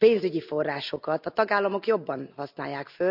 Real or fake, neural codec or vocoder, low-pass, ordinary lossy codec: real; none; 5.4 kHz; none